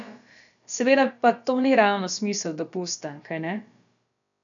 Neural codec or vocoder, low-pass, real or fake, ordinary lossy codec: codec, 16 kHz, about 1 kbps, DyCAST, with the encoder's durations; 7.2 kHz; fake; none